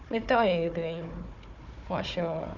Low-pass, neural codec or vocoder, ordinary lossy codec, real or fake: 7.2 kHz; codec, 16 kHz, 4 kbps, FunCodec, trained on Chinese and English, 50 frames a second; none; fake